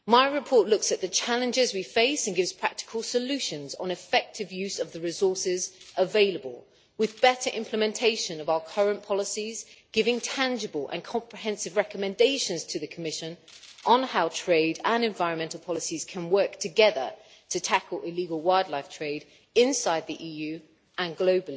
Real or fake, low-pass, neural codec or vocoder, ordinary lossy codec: real; none; none; none